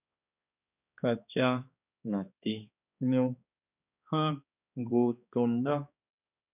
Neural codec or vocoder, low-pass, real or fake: codec, 16 kHz, 2 kbps, X-Codec, HuBERT features, trained on balanced general audio; 3.6 kHz; fake